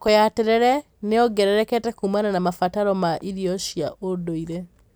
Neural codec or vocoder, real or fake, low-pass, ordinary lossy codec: none; real; none; none